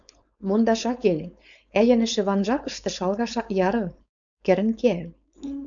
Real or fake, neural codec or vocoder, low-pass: fake; codec, 16 kHz, 4.8 kbps, FACodec; 7.2 kHz